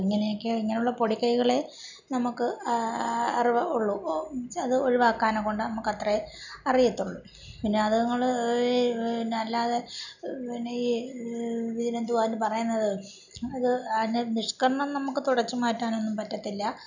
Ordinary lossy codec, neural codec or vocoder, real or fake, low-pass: none; none; real; 7.2 kHz